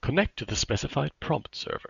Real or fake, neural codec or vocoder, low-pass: real; none; 7.2 kHz